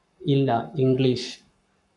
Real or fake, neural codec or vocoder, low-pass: fake; codec, 44.1 kHz, 7.8 kbps, Pupu-Codec; 10.8 kHz